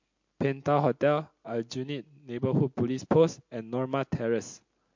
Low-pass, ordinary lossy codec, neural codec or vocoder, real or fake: 7.2 kHz; MP3, 48 kbps; none; real